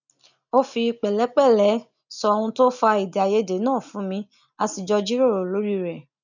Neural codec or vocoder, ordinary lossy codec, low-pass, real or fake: none; none; 7.2 kHz; real